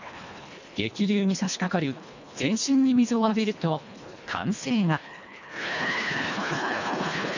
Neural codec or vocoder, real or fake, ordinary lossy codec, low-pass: codec, 24 kHz, 1.5 kbps, HILCodec; fake; none; 7.2 kHz